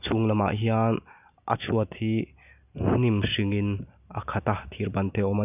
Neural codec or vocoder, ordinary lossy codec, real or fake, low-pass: none; none; real; 3.6 kHz